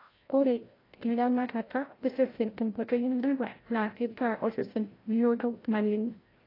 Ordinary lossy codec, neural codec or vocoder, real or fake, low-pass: AAC, 24 kbps; codec, 16 kHz, 0.5 kbps, FreqCodec, larger model; fake; 5.4 kHz